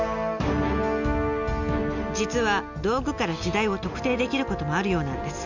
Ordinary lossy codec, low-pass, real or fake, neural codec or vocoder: none; 7.2 kHz; real; none